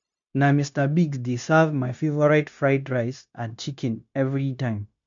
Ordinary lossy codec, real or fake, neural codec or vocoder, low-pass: MP3, 64 kbps; fake; codec, 16 kHz, 0.9 kbps, LongCat-Audio-Codec; 7.2 kHz